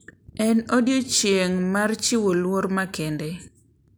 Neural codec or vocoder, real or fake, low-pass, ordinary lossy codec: none; real; none; none